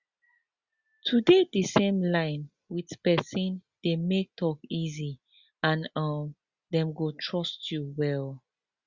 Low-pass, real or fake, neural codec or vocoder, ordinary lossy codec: 7.2 kHz; real; none; Opus, 64 kbps